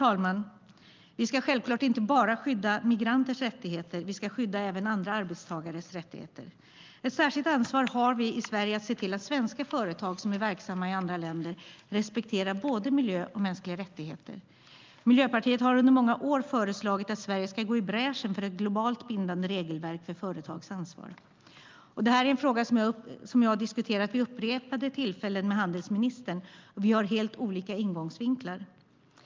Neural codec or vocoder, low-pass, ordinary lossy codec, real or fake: none; 7.2 kHz; Opus, 24 kbps; real